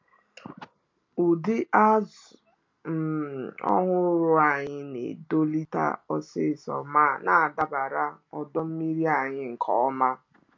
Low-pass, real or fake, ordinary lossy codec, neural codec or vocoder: 7.2 kHz; real; MP3, 64 kbps; none